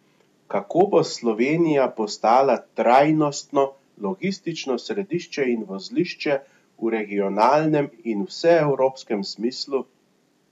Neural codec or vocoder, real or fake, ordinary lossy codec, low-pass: none; real; none; 14.4 kHz